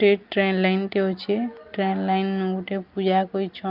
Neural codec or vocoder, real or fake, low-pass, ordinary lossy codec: none; real; 5.4 kHz; Opus, 32 kbps